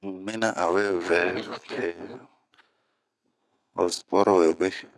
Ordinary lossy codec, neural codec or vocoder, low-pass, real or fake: none; none; none; real